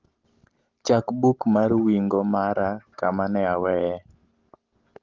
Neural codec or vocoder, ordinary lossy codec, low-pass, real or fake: none; Opus, 16 kbps; 7.2 kHz; real